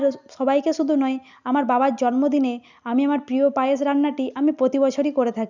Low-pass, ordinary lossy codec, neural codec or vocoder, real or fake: 7.2 kHz; none; none; real